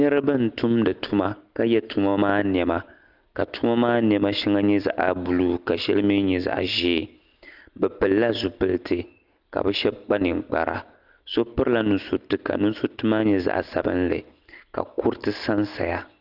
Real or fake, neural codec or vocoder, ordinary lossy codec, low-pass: real; none; Opus, 32 kbps; 5.4 kHz